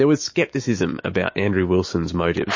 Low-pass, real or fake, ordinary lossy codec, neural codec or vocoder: 7.2 kHz; fake; MP3, 32 kbps; autoencoder, 48 kHz, 128 numbers a frame, DAC-VAE, trained on Japanese speech